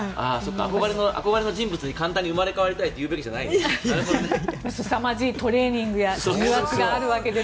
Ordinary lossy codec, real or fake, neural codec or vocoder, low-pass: none; real; none; none